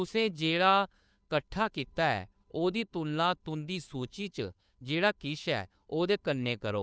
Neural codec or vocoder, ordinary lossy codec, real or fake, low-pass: codec, 16 kHz, 2 kbps, FunCodec, trained on Chinese and English, 25 frames a second; none; fake; none